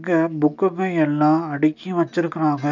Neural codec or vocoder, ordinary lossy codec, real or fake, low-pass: none; none; real; 7.2 kHz